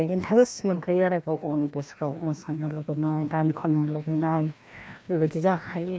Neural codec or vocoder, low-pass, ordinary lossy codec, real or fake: codec, 16 kHz, 1 kbps, FreqCodec, larger model; none; none; fake